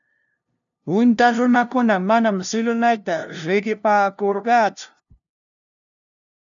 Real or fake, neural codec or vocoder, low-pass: fake; codec, 16 kHz, 0.5 kbps, FunCodec, trained on LibriTTS, 25 frames a second; 7.2 kHz